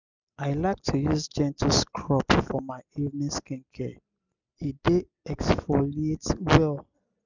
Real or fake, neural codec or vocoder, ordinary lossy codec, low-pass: real; none; none; 7.2 kHz